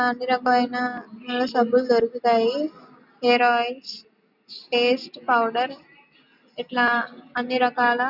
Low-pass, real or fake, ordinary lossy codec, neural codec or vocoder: 5.4 kHz; real; none; none